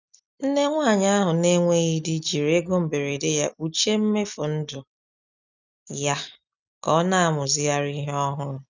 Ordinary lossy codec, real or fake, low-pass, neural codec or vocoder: none; real; 7.2 kHz; none